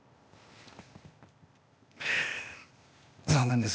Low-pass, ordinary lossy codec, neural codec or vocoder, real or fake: none; none; codec, 16 kHz, 0.8 kbps, ZipCodec; fake